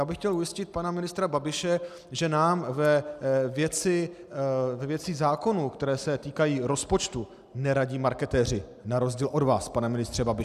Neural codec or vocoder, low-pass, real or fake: none; 14.4 kHz; real